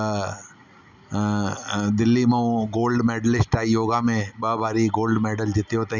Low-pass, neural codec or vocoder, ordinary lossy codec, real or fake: 7.2 kHz; none; none; real